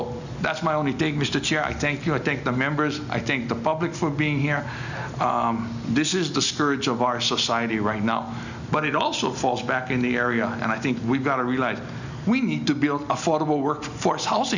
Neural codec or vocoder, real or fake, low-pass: none; real; 7.2 kHz